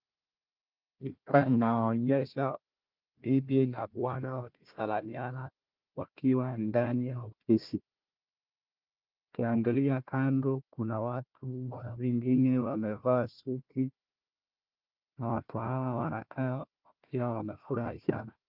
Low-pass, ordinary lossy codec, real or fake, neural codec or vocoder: 5.4 kHz; Opus, 32 kbps; fake; codec, 16 kHz, 1 kbps, FunCodec, trained on Chinese and English, 50 frames a second